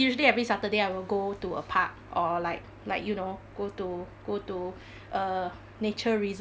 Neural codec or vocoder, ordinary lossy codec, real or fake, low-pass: none; none; real; none